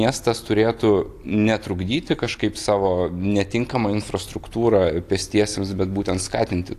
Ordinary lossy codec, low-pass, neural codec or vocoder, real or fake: AAC, 64 kbps; 14.4 kHz; none; real